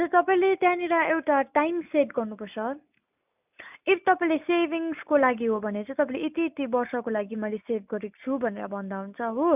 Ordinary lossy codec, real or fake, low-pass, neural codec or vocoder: none; real; 3.6 kHz; none